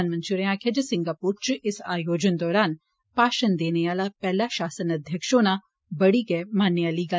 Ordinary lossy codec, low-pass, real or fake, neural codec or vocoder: none; none; real; none